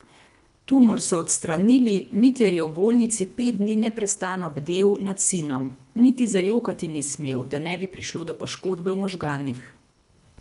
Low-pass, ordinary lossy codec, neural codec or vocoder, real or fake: 10.8 kHz; none; codec, 24 kHz, 1.5 kbps, HILCodec; fake